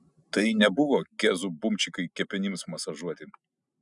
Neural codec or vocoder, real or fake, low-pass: none; real; 10.8 kHz